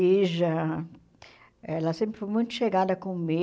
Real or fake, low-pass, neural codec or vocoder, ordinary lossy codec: real; none; none; none